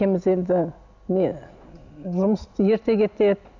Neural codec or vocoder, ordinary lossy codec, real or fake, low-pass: none; none; real; 7.2 kHz